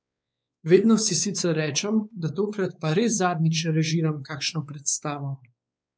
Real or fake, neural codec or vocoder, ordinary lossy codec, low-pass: fake; codec, 16 kHz, 4 kbps, X-Codec, WavLM features, trained on Multilingual LibriSpeech; none; none